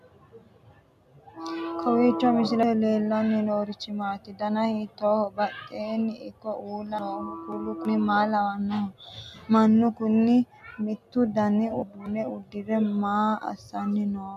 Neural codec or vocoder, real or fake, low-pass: none; real; 14.4 kHz